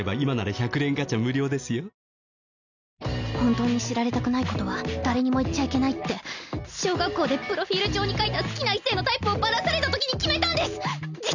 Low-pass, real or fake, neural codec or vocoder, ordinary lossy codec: 7.2 kHz; real; none; none